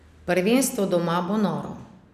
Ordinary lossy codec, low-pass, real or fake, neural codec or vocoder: none; 14.4 kHz; real; none